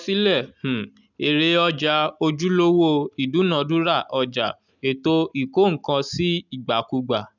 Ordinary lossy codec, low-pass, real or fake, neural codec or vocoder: none; 7.2 kHz; real; none